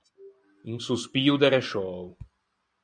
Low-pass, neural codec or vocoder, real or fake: 9.9 kHz; none; real